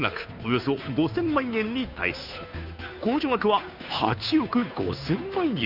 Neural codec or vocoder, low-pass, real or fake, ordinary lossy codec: codec, 16 kHz, 2 kbps, FunCodec, trained on Chinese and English, 25 frames a second; 5.4 kHz; fake; none